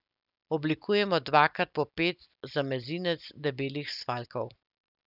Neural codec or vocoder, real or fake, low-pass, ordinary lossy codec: none; real; 5.4 kHz; none